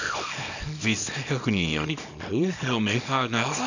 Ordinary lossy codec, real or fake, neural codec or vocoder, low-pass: none; fake; codec, 24 kHz, 0.9 kbps, WavTokenizer, small release; 7.2 kHz